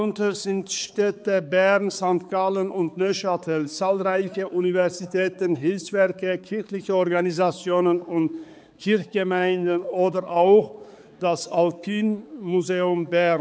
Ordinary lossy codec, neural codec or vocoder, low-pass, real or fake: none; codec, 16 kHz, 4 kbps, X-Codec, HuBERT features, trained on balanced general audio; none; fake